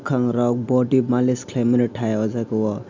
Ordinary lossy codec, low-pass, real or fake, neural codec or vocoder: MP3, 64 kbps; 7.2 kHz; real; none